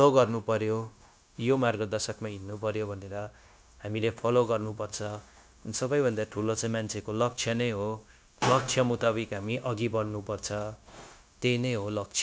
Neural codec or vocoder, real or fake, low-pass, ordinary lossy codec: codec, 16 kHz, 0.9 kbps, LongCat-Audio-Codec; fake; none; none